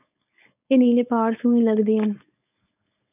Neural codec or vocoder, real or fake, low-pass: codec, 16 kHz, 4.8 kbps, FACodec; fake; 3.6 kHz